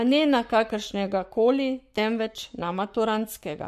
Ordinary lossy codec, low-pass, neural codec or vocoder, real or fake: MP3, 64 kbps; 14.4 kHz; codec, 44.1 kHz, 7.8 kbps, Pupu-Codec; fake